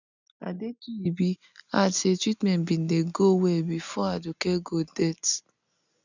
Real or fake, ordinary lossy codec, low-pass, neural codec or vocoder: real; none; 7.2 kHz; none